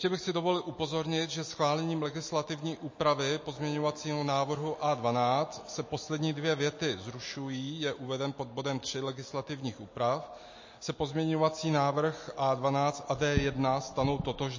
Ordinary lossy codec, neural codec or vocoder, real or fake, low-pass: MP3, 32 kbps; none; real; 7.2 kHz